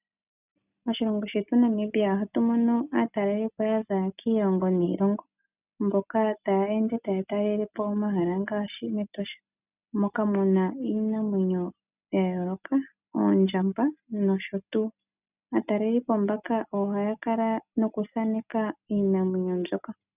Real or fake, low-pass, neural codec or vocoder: real; 3.6 kHz; none